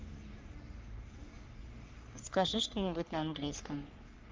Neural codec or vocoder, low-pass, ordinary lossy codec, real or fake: codec, 44.1 kHz, 3.4 kbps, Pupu-Codec; 7.2 kHz; Opus, 24 kbps; fake